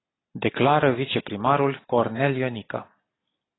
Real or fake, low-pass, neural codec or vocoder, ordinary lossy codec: real; 7.2 kHz; none; AAC, 16 kbps